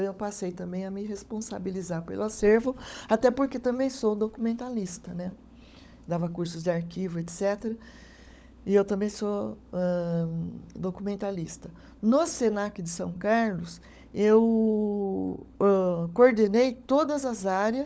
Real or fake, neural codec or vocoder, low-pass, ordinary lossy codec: fake; codec, 16 kHz, 16 kbps, FunCodec, trained on LibriTTS, 50 frames a second; none; none